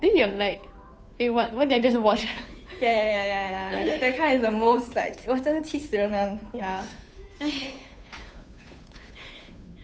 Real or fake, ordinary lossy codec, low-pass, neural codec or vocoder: fake; none; none; codec, 16 kHz, 2 kbps, FunCodec, trained on Chinese and English, 25 frames a second